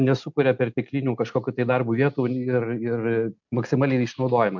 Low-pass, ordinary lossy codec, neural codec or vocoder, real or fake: 7.2 kHz; MP3, 64 kbps; none; real